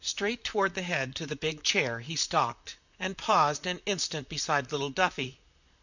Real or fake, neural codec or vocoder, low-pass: fake; vocoder, 44.1 kHz, 128 mel bands, Pupu-Vocoder; 7.2 kHz